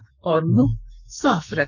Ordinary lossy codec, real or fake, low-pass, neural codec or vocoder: none; fake; 7.2 kHz; codec, 44.1 kHz, 2.6 kbps, SNAC